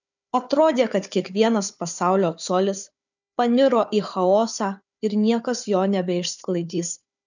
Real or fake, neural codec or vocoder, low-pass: fake; codec, 16 kHz, 4 kbps, FunCodec, trained on Chinese and English, 50 frames a second; 7.2 kHz